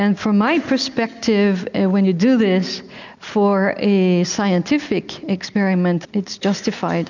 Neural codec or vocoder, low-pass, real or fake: none; 7.2 kHz; real